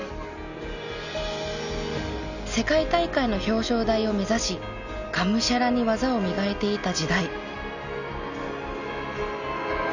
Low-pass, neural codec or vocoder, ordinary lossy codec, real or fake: 7.2 kHz; none; none; real